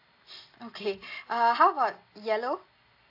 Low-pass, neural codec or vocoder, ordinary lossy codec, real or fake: 5.4 kHz; none; AAC, 32 kbps; real